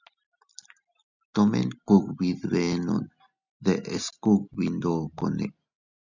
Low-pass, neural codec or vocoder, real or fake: 7.2 kHz; none; real